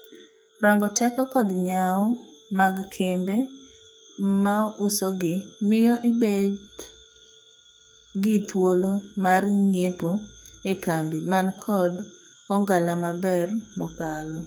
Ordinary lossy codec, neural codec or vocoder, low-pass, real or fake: none; codec, 44.1 kHz, 2.6 kbps, SNAC; none; fake